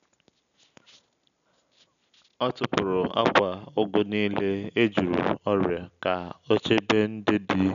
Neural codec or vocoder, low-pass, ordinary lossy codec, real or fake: none; 7.2 kHz; none; real